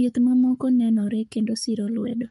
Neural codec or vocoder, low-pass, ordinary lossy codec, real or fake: codec, 44.1 kHz, 7.8 kbps, Pupu-Codec; 19.8 kHz; MP3, 48 kbps; fake